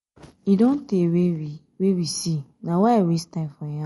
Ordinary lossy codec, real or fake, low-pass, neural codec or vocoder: MP3, 48 kbps; real; 19.8 kHz; none